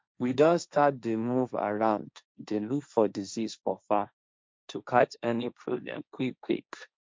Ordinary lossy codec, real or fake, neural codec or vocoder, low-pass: none; fake; codec, 16 kHz, 1.1 kbps, Voila-Tokenizer; none